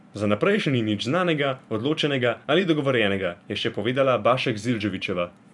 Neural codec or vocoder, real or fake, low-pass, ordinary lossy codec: vocoder, 44.1 kHz, 128 mel bands every 512 samples, BigVGAN v2; fake; 10.8 kHz; none